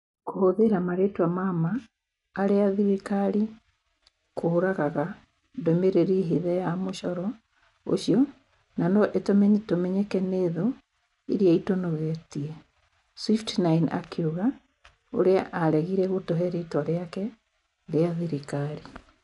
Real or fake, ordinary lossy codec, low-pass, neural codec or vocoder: real; none; 10.8 kHz; none